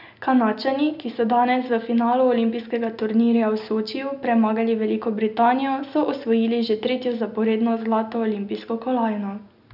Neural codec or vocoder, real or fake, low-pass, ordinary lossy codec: none; real; 5.4 kHz; none